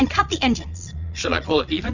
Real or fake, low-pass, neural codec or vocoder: fake; 7.2 kHz; vocoder, 22.05 kHz, 80 mel bands, Vocos